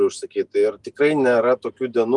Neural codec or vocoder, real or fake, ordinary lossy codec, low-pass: none; real; Opus, 32 kbps; 10.8 kHz